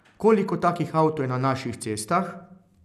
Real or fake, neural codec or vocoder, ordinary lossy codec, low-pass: real; none; none; 14.4 kHz